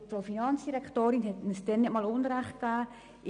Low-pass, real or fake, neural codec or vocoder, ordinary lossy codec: 9.9 kHz; real; none; none